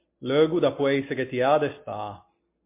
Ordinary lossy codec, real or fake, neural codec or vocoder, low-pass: MP3, 24 kbps; real; none; 3.6 kHz